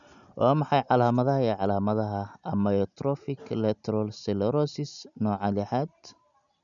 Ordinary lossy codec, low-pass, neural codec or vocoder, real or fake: none; 7.2 kHz; none; real